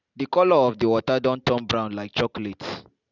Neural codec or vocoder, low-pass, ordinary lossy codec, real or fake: none; 7.2 kHz; none; real